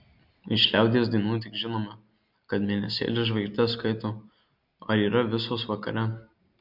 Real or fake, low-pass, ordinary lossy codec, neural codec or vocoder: real; 5.4 kHz; MP3, 48 kbps; none